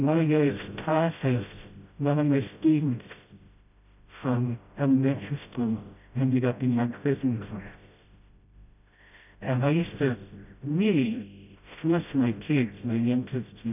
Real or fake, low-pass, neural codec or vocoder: fake; 3.6 kHz; codec, 16 kHz, 0.5 kbps, FreqCodec, smaller model